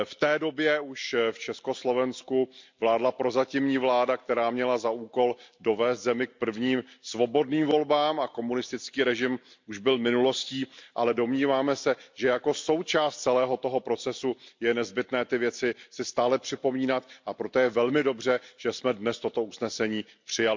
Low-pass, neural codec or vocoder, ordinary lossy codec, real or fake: 7.2 kHz; none; MP3, 64 kbps; real